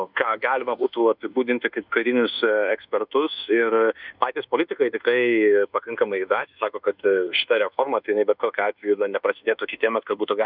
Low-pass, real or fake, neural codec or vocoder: 5.4 kHz; fake; codec, 24 kHz, 1.2 kbps, DualCodec